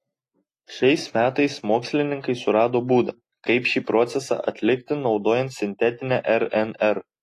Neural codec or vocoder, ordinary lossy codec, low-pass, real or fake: none; AAC, 48 kbps; 14.4 kHz; real